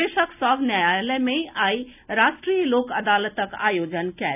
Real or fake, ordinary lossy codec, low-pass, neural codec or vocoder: real; none; 3.6 kHz; none